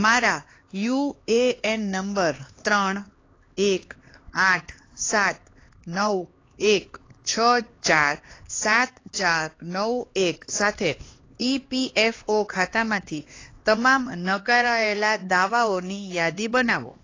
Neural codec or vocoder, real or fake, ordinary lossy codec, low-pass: codec, 16 kHz, 2 kbps, X-Codec, HuBERT features, trained on LibriSpeech; fake; AAC, 32 kbps; 7.2 kHz